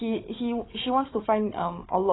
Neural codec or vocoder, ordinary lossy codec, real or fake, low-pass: codec, 16 kHz, 4 kbps, FunCodec, trained on Chinese and English, 50 frames a second; AAC, 16 kbps; fake; 7.2 kHz